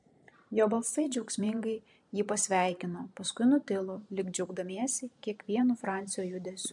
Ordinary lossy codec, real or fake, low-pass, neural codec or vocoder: MP3, 64 kbps; fake; 10.8 kHz; vocoder, 44.1 kHz, 128 mel bands every 512 samples, BigVGAN v2